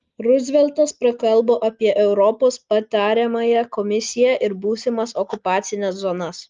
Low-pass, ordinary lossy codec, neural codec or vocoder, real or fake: 7.2 kHz; Opus, 24 kbps; none; real